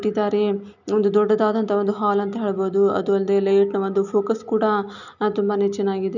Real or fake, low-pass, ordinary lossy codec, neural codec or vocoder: real; 7.2 kHz; none; none